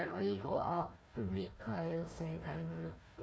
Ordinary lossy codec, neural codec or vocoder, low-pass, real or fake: none; codec, 16 kHz, 1 kbps, FunCodec, trained on Chinese and English, 50 frames a second; none; fake